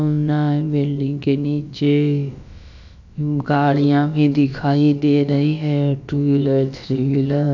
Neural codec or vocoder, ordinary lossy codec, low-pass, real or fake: codec, 16 kHz, about 1 kbps, DyCAST, with the encoder's durations; none; 7.2 kHz; fake